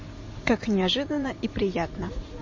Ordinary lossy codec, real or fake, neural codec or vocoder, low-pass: MP3, 32 kbps; fake; vocoder, 44.1 kHz, 128 mel bands every 512 samples, BigVGAN v2; 7.2 kHz